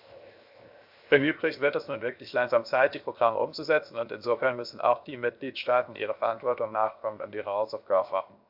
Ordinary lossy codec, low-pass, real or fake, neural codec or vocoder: AAC, 48 kbps; 5.4 kHz; fake; codec, 16 kHz, 0.7 kbps, FocalCodec